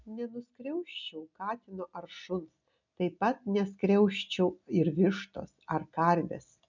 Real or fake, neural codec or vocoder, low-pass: real; none; 7.2 kHz